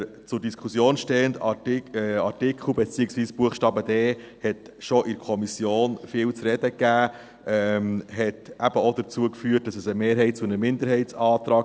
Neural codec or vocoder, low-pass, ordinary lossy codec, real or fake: none; none; none; real